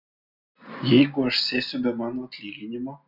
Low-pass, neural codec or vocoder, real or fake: 5.4 kHz; none; real